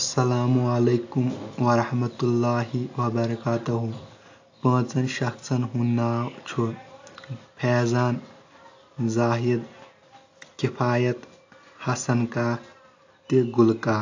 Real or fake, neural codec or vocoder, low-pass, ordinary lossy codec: real; none; 7.2 kHz; AAC, 48 kbps